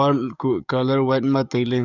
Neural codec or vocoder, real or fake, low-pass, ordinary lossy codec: codec, 44.1 kHz, 7.8 kbps, DAC; fake; 7.2 kHz; none